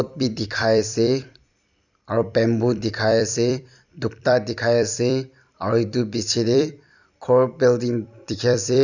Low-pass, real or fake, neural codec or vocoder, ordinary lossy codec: 7.2 kHz; fake; vocoder, 44.1 kHz, 128 mel bands every 256 samples, BigVGAN v2; none